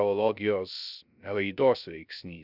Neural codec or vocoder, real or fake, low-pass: codec, 16 kHz, 0.3 kbps, FocalCodec; fake; 5.4 kHz